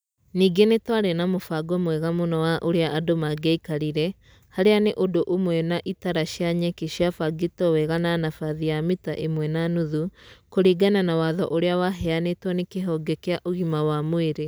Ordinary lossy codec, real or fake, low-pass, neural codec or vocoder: none; real; none; none